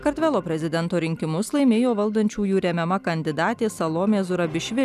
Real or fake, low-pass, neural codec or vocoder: real; 14.4 kHz; none